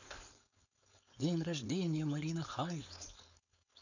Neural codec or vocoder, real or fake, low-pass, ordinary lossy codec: codec, 16 kHz, 4.8 kbps, FACodec; fake; 7.2 kHz; none